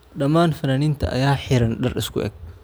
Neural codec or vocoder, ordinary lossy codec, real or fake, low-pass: none; none; real; none